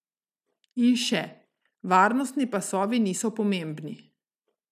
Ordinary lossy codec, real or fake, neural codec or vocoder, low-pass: none; real; none; 14.4 kHz